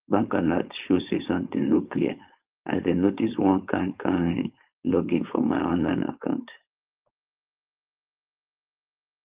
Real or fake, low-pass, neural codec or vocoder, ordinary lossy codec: fake; 3.6 kHz; codec, 16 kHz, 4.8 kbps, FACodec; Opus, 32 kbps